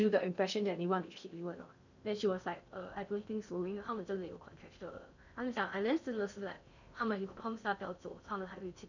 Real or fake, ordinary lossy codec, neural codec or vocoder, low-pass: fake; none; codec, 16 kHz in and 24 kHz out, 0.6 kbps, FocalCodec, streaming, 2048 codes; 7.2 kHz